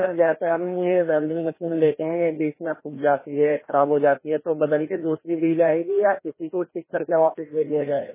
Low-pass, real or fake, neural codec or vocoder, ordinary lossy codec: 3.6 kHz; fake; codec, 16 kHz, 1 kbps, FreqCodec, larger model; MP3, 16 kbps